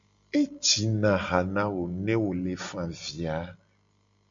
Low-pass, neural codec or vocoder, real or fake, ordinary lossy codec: 7.2 kHz; none; real; MP3, 64 kbps